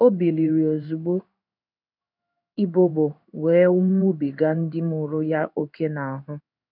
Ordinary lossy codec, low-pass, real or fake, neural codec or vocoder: none; 5.4 kHz; fake; codec, 16 kHz in and 24 kHz out, 1 kbps, XY-Tokenizer